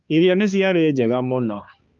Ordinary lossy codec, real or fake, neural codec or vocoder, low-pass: Opus, 32 kbps; fake; codec, 16 kHz, 2 kbps, X-Codec, HuBERT features, trained on balanced general audio; 7.2 kHz